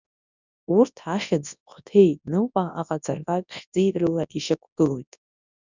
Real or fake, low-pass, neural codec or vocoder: fake; 7.2 kHz; codec, 24 kHz, 0.9 kbps, WavTokenizer, large speech release